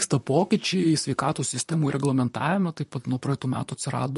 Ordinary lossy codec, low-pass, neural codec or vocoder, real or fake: MP3, 48 kbps; 14.4 kHz; vocoder, 44.1 kHz, 128 mel bands, Pupu-Vocoder; fake